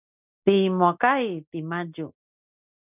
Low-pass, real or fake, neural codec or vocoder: 3.6 kHz; real; none